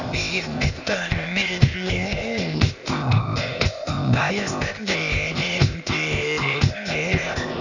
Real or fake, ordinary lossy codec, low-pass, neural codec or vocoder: fake; none; 7.2 kHz; codec, 16 kHz, 0.8 kbps, ZipCodec